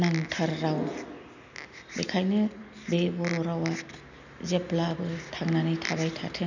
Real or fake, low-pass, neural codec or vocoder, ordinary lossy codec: real; 7.2 kHz; none; none